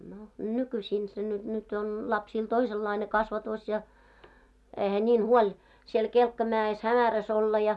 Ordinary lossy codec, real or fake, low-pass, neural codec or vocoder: none; real; none; none